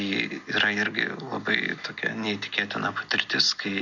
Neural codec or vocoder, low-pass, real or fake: none; 7.2 kHz; real